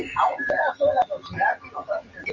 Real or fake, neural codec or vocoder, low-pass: real; none; 7.2 kHz